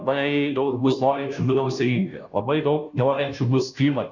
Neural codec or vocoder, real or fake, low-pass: codec, 16 kHz, 0.5 kbps, FunCodec, trained on Chinese and English, 25 frames a second; fake; 7.2 kHz